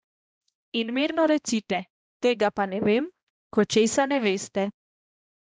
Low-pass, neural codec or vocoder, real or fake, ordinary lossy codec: none; codec, 16 kHz, 1 kbps, X-Codec, HuBERT features, trained on balanced general audio; fake; none